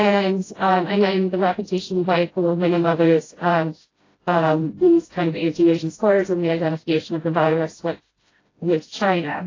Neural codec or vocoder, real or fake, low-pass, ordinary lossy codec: codec, 16 kHz, 0.5 kbps, FreqCodec, smaller model; fake; 7.2 kHz; AAC, 32 kbps